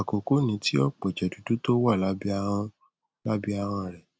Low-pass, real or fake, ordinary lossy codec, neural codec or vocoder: none; real; none; none